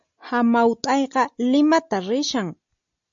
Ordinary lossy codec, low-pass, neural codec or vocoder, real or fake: AAC, 64 kbps; 7.2 kHz; none; real